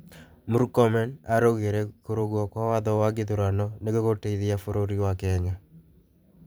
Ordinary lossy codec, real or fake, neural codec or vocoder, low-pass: none; fake; vocoder, 44.1 kHz, 128 mel bands every 512 samples, BigVGAN v2; none